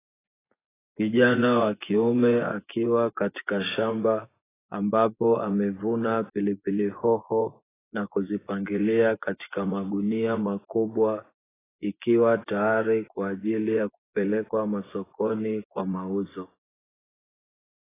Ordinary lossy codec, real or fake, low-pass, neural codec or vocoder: AAC, 16 kbps; fake; 3.6 kHz; vocoder, 24 kHz, 100 mel bands, Vocos